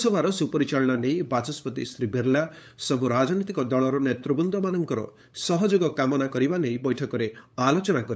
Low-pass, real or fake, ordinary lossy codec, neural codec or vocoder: none; fake; none; codec, 16 kHz, 8 kbps, FunCodec, trained on LibriTTS, 25 frames a second